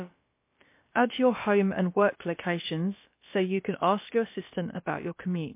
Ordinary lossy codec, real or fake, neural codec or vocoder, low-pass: MP3, 24 kbps; fake; codec, 16 kHz, about 1 kbps, DyCAST, with the encoder's durations; 3.6 kHz